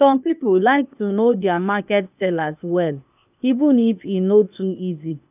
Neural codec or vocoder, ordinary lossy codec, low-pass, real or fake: codec, 16 kHz, 0.8 kbps, ZipCodec; none; 3.6 kHz; fake